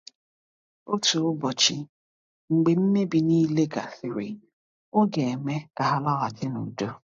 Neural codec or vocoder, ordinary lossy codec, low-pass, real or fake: none; AAC, 48 kbps; 7.2 kHz; real